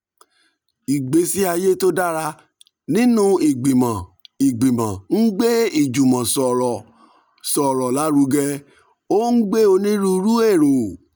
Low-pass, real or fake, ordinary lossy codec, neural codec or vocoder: none; real; none; none